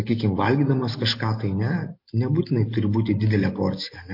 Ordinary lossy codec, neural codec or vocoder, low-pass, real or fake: MP3, 32 kbps; none; 5.4 kHz; real